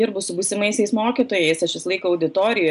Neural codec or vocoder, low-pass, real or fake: none; 10.8 kHz; real